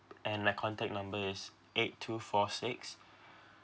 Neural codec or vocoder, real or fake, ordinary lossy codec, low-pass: none; real; none; none